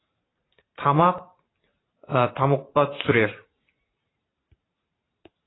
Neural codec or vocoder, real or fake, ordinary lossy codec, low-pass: vocoder, 44.1 kHz, 128 mel bands every 512 samples, BigVGAN v2; fake; AAC, 16 kbps; 7.2 kHz